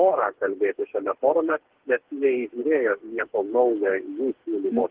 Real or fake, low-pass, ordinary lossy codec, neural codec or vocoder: fake; 3.6 kHz; Opus, 16 kbps; codec, 16 kHz, 4 kbps, FreqCodec, smaller model